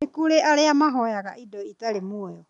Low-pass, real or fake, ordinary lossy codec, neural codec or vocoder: 10.8 kHz; real; none; none